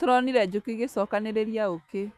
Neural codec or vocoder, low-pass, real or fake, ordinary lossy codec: autoencoder, 48 kHz, 128 numbers a frame, DAC-VAE, trained on Japanese speech; 14.4 kHz; fake; none